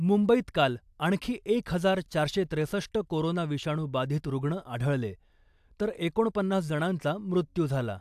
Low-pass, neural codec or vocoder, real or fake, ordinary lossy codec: 14.4 kHz; none; real; AAC, 96 kbps